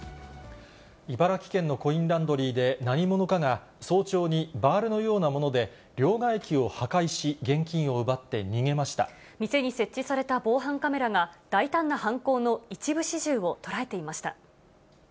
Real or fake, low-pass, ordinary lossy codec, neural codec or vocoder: real; none; none; none